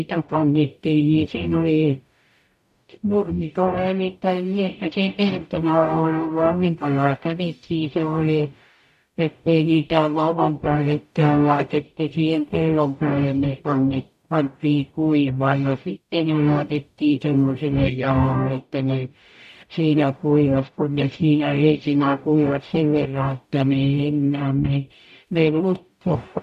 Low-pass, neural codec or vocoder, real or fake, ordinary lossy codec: 14.4 kHz; codec, 44.1 kHz, 0.9 kbps, DAC; fake; AAC, 96 kbps